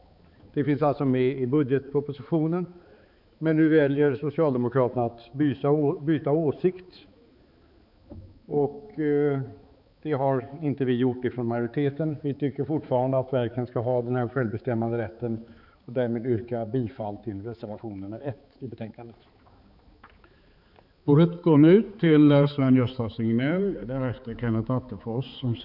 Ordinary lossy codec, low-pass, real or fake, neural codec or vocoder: none; 5.4 kHz; fake; codec, 16 kHz, 4 kbps, X-Codec, HuBERT features, trained on balanced general audio